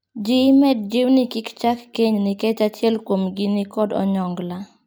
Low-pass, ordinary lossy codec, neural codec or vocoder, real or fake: none; none; none; real